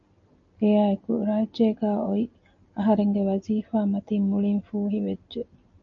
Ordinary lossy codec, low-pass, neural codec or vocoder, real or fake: MP3, 64 kbps; 7.2 kHz; none; real